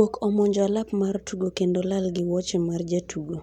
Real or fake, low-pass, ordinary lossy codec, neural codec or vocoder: real; 19.8 kHz; none; none